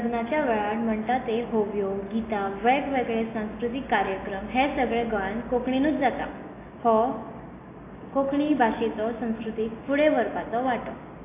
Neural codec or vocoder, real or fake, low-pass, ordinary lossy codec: none; real; 3.6 kHz; AAC, 24 kbps